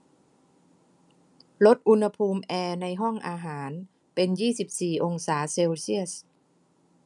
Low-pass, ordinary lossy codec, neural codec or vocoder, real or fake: 10.8 kHz; none; none; real